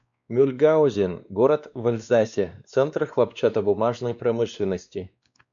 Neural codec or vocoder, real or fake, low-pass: codec, 16 kHz, 2 kbps, X-Codec, WavLM features, trained on Multilingual LibriSpeech; fake; 7.2 kHz